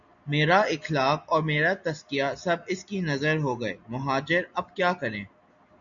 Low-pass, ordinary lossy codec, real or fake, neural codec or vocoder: 7.2 kHz; MP3, 96 kbps; real; none